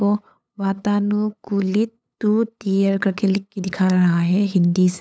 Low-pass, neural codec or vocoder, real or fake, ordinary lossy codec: none; codec, 16 kHz, 8 kbps, FunCodec, trained on LibriTTS, 25 frames a second; fake; none